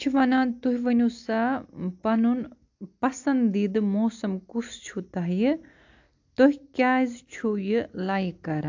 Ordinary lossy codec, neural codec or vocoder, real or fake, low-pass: AAC, 48 kbps; none; real; 7.2 kHz